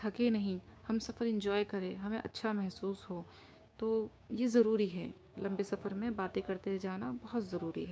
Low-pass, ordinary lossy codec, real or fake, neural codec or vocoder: none; none; fake; codec, 16 kHz, 6 kbps, DAC